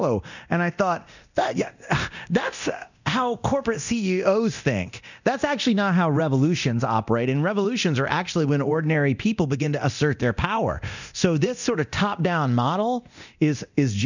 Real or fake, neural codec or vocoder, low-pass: fake; codec, 24 kHz, 0.9 kbps, DualCodec; 7.2 kHz